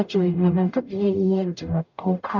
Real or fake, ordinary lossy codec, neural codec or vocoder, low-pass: fake; none; codec, 44.1 kHz, 0.9 kbps, DAC; 7.2 kHz